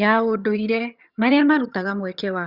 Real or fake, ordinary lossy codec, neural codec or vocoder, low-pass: fake; Opus, 64 kbps; vocoder, 22.05 kHz, 80 mel bands, HiFi-GAN; 5.4 kHz